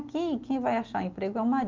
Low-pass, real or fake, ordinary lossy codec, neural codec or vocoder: 7.2 kHz; real; Opus, 24 kbps; none